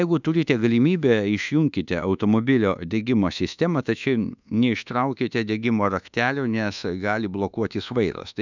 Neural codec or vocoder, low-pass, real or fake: codec, 24 kHz, 1.2 kbps, DualCodec; 7.2 kHz; fake